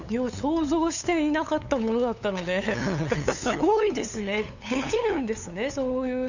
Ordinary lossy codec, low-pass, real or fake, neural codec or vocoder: none; 7.2 kHz; fake; codec, 16 kHz, 8 kbps, FunCodec, trained on LibriTTS, 25 frames a second